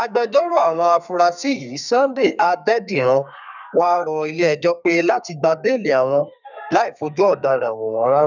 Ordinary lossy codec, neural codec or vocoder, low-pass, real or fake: none; codec, 32 kHz, 1.9 kbps, SNAC; 7.2 kHz; fake